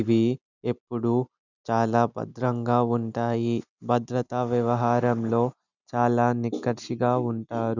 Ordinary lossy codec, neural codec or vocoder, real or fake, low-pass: none; none; real; 7.2 kHz